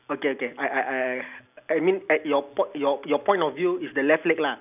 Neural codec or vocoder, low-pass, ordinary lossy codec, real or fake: none; 3.6 kHz; none; real